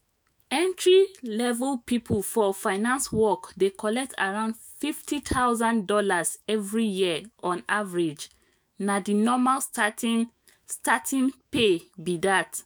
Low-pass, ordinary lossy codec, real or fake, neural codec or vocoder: none; none; fake; autoencoder, 48 kHz, 128 numbers a frame, DAC-VAE, trained on Japanese speech